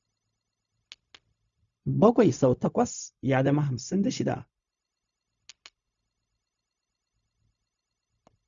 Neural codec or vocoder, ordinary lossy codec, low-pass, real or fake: codec, 16 kHz, 0.4 kbps, LongCat-Audio-Codec; none; 7.2 kHz; fake